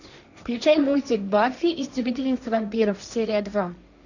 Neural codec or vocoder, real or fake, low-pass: codec, 16 kHz, 1.1 kbps, Voila-Tokenizer; fake; 7.2 kHz